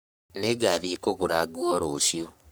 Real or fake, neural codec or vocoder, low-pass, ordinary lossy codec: fake; codec, 44.1 kHz, 3.4 kbps, Pupu-Codec; none; none